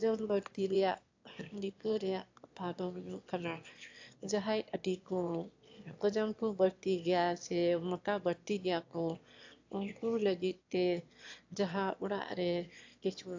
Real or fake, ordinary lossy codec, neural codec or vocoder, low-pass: fake; AAC, 48 kbps; autoencoder, 22.05 kHz, a latent of 192 numbers a frame, VITS, trained on one speaker; 7.2 kHz